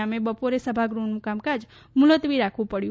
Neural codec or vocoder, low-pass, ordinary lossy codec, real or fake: none; none; none; real